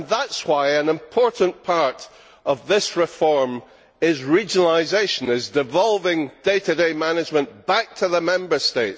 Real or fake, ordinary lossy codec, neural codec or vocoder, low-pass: real; none; none; none